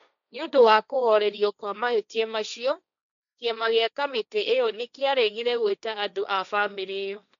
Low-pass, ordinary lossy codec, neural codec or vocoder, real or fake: 7.2 kHz; none; codec, 16 kHz, 1.1 kbps, Voila-Tokenizer; fake